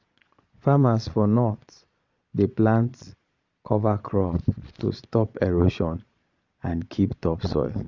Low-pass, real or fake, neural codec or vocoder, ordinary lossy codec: 7.2 kHz; fake; vocoder, 22.05 kHz, 80 mel bands, Vocos; none